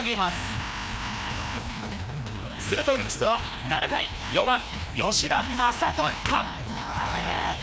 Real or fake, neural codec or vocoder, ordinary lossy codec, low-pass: fake; codec, 16 kHz, 1 kbps, FreqCodec, larger model; none; none